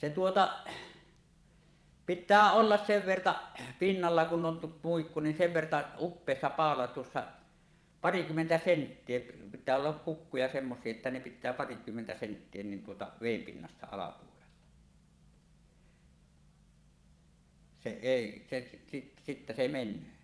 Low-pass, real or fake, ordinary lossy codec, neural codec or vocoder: none; fake; none; vocoder, 22.05 kHz, 80 mel bands, Vocos